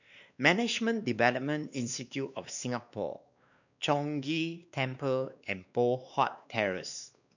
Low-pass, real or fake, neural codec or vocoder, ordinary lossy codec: 7.2 kHz; fake; codec, 16 kHz, 2 kbps, X-Codec, WavLM features, trained on Multilingual LibriSpeech; none